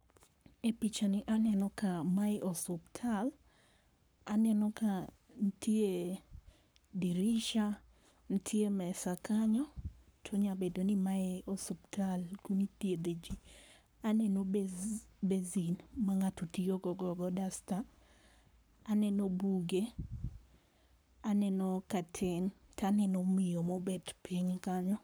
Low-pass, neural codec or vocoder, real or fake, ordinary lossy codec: none; codec, 44.1 kHz, 7.8 kbps, Pupu-Codec; fake; none